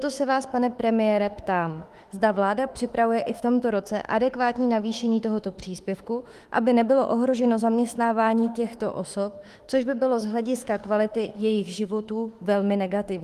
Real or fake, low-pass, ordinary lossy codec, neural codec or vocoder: fake; 14.4 kHz; Opus, 24 kbps; autoencoder, 48 kHz, 32 numbers a frame, DAC-VAE, trained on Japanese speech